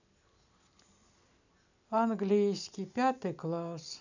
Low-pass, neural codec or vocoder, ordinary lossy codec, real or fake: 7.2 kHz; none; none; real